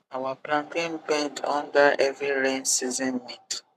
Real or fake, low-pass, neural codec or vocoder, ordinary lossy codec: fake; 14.4 kHz; codec, 44.1 kHz, 7.8 kbps, Pupu-Codec; none